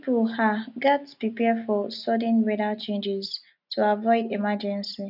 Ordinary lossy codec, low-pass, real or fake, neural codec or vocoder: none; 5.4 kHz; real; none